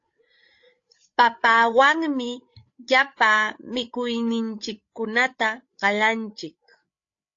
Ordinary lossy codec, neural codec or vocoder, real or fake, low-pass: AAC, 48 kbps; codec, 16 kHz, 8 kbps, FreqCodec, larger model; fake; 7.2 kHz